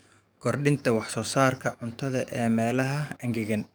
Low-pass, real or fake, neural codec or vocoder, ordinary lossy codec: none; fake; codec, 44.1 kHz, 7.8 kbps, DAC; none